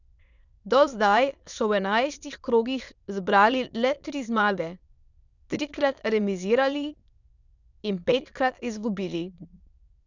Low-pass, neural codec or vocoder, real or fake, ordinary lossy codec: 7.2 kHz; autoencoder, 22.05 kHz, a latent of 192 numbers a frame, VITS, trained on many speakers; fake; none